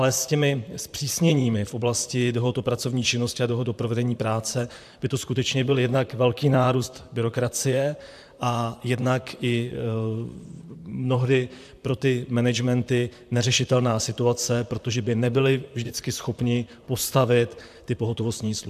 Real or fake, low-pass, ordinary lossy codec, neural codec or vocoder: fake; 14.4 kHz; AAC, 96 kbps; vocoder, 44.1 kHz, 128 mel bands, Pupu-Vocoder